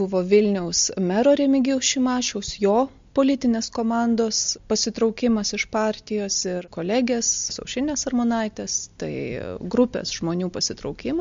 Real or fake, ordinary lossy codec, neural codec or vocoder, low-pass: real; MP3, 48 kbps; none; 7.2 kHz